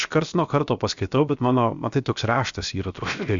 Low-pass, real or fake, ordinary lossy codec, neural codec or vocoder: 7.2 kHz; fake; Opus, 64 kbps; codec, 16 kHz, 0.7 kbps, FocalCodec